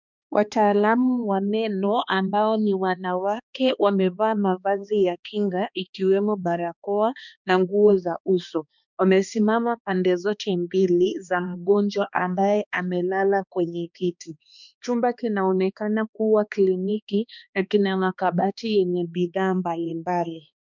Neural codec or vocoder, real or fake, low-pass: codec, 16 kHz, 2 kbps, X-Codec, HuBERT features, trained on balanced general audio; fake; 7.2 kHz